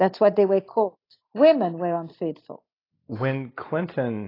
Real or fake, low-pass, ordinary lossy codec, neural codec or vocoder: real; 5.4 kHz; AAC, 24 kbps; none